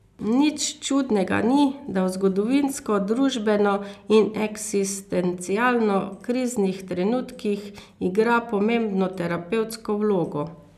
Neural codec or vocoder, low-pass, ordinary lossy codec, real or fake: none; 14.4 kHz; none; real